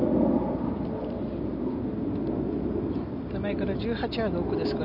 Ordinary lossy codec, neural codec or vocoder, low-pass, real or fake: none; none; 5.4 kHz; real